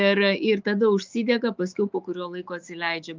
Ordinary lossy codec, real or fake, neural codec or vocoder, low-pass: Opus, 24 kbps; fake; autoencoder, 48 kHz, 128 numbers a frame, DAC-VAE, trained on Japanese speech; 7.2 kHz